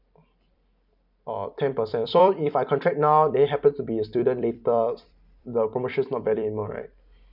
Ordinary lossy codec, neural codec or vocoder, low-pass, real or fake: none; vocoder, 44.1 kHz, 128 mel bands every 256 samples, BigVGAN v2; 5.4 kHz; fake